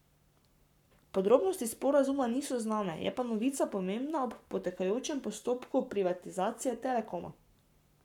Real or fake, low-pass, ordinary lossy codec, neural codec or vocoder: fake; 19.8 kHz; none; codec, 44.1 kHz, 7.8 kbps, Pupu-Codec